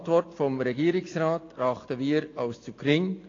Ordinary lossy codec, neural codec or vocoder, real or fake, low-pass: AAC, 32 kbps; none; real; 7.2 kHz